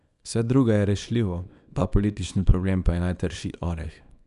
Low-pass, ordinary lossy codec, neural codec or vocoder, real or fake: 10.8 kHz; none; codec, 24 kHz, 0.9 kbps, WavTokenizer, small release; fake